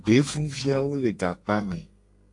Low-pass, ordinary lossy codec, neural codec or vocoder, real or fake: 10.8 kHz; MP3, 64 kbps; codec, 32 kHz, 1.9 kbps, SNAC; fake